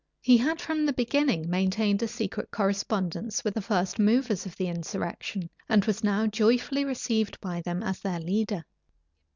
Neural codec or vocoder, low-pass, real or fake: none; 7.2 kHz; real